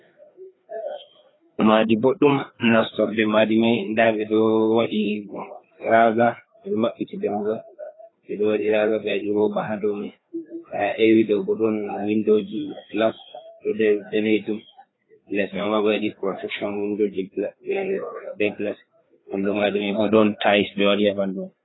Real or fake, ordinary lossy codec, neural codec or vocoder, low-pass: fake; AAC, 16 kbps; codec, 16 kHz, 2 kbps, FreqCodec, larger model; 7.2 kHz